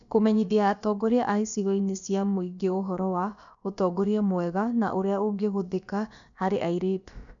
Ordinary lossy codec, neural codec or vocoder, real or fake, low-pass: none; codec, 16 kHz, about 1 kbps, DyCAST, with the encoder's durations; fake; 7.2 kHz